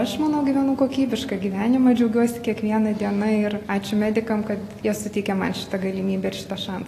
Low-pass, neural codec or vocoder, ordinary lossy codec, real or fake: 14.4 kHz; none; AAC, 48 kbps; real